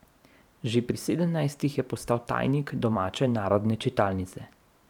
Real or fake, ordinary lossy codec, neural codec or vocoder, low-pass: fake; none; vocoder, 44.1 kHz, 128 mel bands every 512 samples, BigVGAN v2; 19.8 kHz